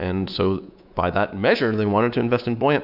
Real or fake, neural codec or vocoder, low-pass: fake; codec, 24 kHz, 3.1 kbps, DualCodec; 5.4 kHz